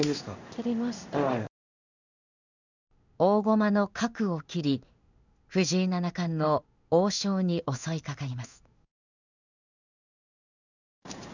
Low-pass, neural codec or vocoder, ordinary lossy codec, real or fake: 7.2 kHz; codec, 16 kHz in and 24 kHz out, 1 kbps, XY-Tokenizer; none; fake